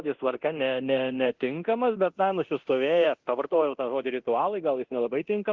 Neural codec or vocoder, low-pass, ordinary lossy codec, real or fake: codec, 24 kHz, 0.9 kbps, DualCodec; 7.2 kHz; Opus, 16 kbps; fake